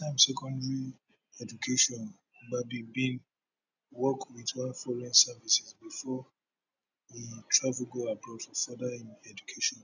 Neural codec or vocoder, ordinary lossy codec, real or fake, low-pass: none; none; real; 7.2 kHz